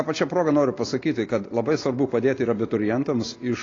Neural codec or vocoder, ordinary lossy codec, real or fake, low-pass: none; AAC, 32 kbps; real; 7.2 kHz